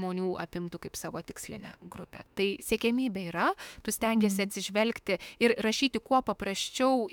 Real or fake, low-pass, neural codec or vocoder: fake; 19.8 kHz; autoencoder, 48 kHz, 32 numbers a frame, DAC-VAE, trained on Japanese speech